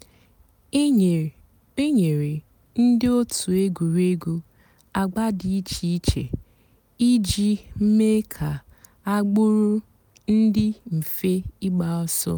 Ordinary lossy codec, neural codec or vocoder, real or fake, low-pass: none; none; real; none